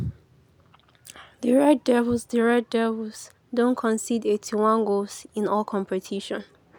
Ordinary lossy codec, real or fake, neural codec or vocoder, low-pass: none; real; none; none